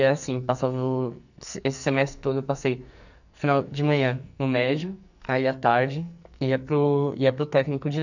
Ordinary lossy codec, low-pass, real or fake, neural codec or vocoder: none; 7.2 kHz; fake; codec, 44.1 kHz, 2.6 kbps, SNAC